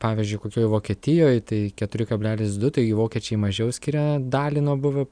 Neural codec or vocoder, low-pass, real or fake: none; 9.9 kHz; real